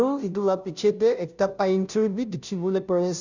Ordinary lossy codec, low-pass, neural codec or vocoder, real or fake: none; 7.2 kHz; codec, 16 kHz, 0.5 kbps, FunCodec, trained on Chinese and English, 25 frames a second; fake